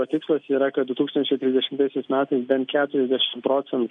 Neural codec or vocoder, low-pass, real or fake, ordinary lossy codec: none; 9.9 kHz; real; MP3, 64 kbps